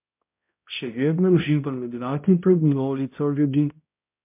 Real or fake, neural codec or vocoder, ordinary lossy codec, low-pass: fake; codec, 16 kHz, 0.5 kbps, X-Codec, HuBERT features, trained on balanced general audio; MP3, 32 kbps; 3.6 kHz